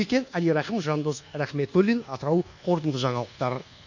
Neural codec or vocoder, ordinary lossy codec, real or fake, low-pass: codec, 24 kHz, 1.2 kbps, DualCodec; none; fake; 7.2 kHz